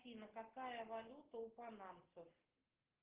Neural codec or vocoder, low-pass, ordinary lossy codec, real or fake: none; 3.6 kHz; Opus, 16 kbps; real